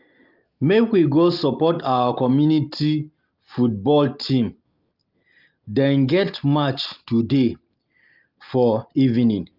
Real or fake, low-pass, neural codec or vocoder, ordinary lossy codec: real; 5.4 kHz; none; Opus, 24 kbps